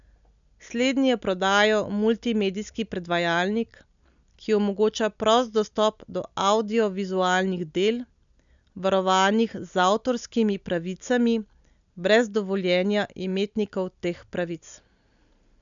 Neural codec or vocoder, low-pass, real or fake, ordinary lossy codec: none; 7.2 kHz; real; none